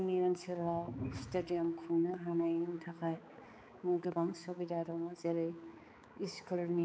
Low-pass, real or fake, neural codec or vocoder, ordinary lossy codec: none; fake; codec, 16 kHz, 4 kbps, X-Codec, HuBERT features, trained on balanced general audio; none